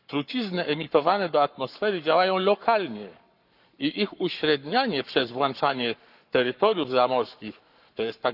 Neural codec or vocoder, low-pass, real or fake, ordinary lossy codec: codec, 44.1 kHz, 7.8 kbps, Pupu-Codec; 5.4 kHz; fake; none